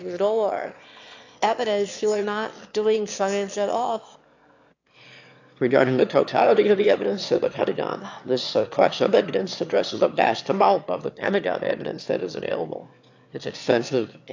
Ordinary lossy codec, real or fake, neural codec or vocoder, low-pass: AAC, 48 kbps; fake; autoencoder, 22.05 kHz, a latent of 192 numbers a frame, VITS, trained on one speaker; 7.2 kHz